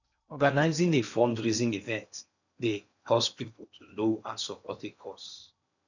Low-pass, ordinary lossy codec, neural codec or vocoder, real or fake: 7.2 kHz; none; codec, 16 kHz in and 24 kHz out, 0.8 kbps, FocalCodec, streaming, 65536 codes; fake